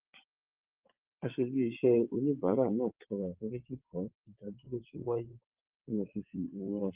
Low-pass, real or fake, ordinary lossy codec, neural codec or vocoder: 3.6 kHz; fake; Opus, 24 kbps; vocoder, 22.05 kHz, 80 mel bands, WaveNeXt